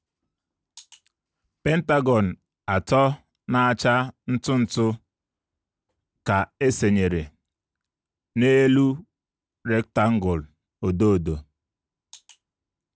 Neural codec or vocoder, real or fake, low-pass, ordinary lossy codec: none; real; none; none